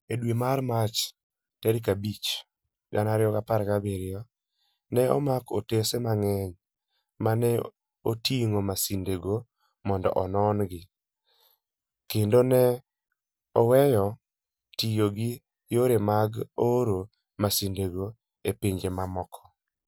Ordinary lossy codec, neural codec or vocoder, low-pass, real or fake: none; none; none; real